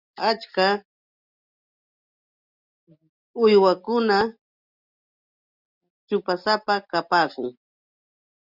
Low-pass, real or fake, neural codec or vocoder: 5.4 kHz; real; none